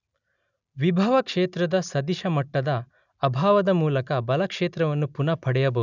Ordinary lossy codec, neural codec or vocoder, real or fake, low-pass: none; none; real; 7.2 kHz